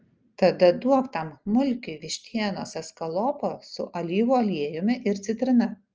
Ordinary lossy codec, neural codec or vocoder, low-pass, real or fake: Opus, 24 kbps; none; 7.2 kHz; real